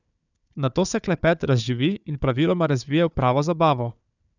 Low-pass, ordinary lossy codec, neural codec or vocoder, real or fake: 7.2 kHz; none; codec, 16 kHz, 4 kbps, FunCodec, trained on Chinese and English, 50 frames a second; fake